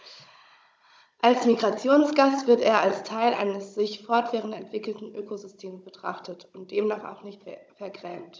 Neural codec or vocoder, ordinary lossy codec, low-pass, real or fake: codec, 16 kHz, 16 kbps, FunCodec, trained on Chinese and English, 50 frames a second; none; none; fake